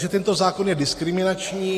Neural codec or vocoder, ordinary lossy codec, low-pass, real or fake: none; AAC, 48 kbps; 14.4 kHz; real